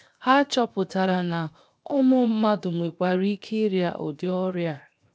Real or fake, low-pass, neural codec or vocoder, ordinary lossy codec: fake; none; codec, 16 kHz, 0.7 kbps, FocalCodec; none